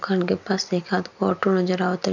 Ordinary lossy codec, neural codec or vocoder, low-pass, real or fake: none; none; 7.2 kHz; real